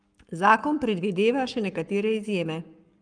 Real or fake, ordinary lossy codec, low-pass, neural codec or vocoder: fake; Opus, 32 kbps; 9.9 kHz; codec, 44.1 kHz, 7.8 kbps, Pupu-Codec